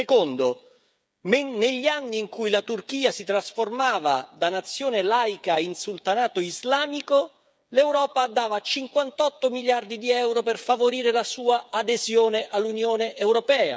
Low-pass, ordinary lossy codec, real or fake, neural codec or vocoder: none; none; fake; codec, 16 kHz, 8 kbps, FreqCodec, smaller model